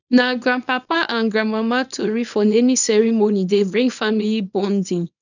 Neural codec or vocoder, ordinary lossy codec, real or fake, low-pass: codec, 24 kHz, 0.9 kbps, WavTokenizer, small release; none; fake; 7.2 kHz